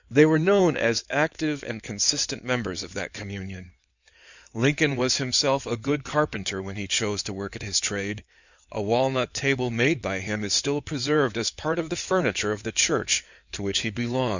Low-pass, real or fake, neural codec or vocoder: 7.2 kHz; fake; codec, 16 kHz in and 24 kHz out, 2.2 kbps, FireRedTTS-2 codec